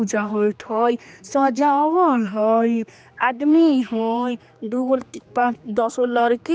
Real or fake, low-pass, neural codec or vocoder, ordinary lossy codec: fake; none; codec, 16 kHz, 2 kbps, X-Codec, HuBERT features, trained on general audio; none